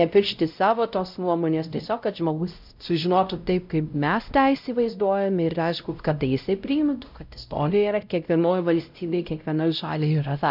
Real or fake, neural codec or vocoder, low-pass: fake; codec, 16 kHz, 0.5 kbps, X-Codec, WavLM features, trained on Multilingual LibriSpeech; 5.4 kHz